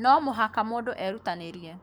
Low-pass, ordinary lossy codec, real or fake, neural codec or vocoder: none; none; fake; codec, 44.1 kHz, 7.8 kbps, Pupu-Codec